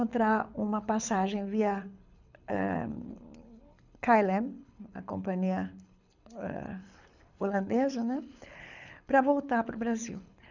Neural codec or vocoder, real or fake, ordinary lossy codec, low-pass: codec, 24 kHz, 6 kbps, HILCodec; fake; none; 7.2 kHz